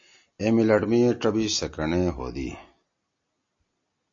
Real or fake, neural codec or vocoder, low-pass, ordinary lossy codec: real; none; 7.2 kHz; AAC, 48 kbps